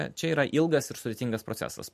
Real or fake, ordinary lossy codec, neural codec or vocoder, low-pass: real; MP3, 64 kbps; none; 14.4 kHz